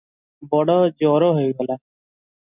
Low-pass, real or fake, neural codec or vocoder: 3.6 kHz; real; none